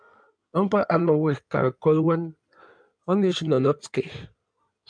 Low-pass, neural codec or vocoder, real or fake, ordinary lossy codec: 9.9 kHz; codec, 16 kHz in and 24 kHz out, 2.2 kbps, FireRedTTS-2 codec; fake; MP3, 96 kbps